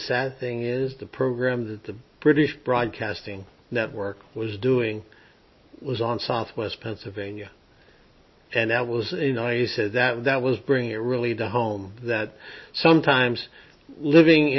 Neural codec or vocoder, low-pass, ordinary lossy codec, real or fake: none; 7.2 kHz; MP3, 24 kbps; real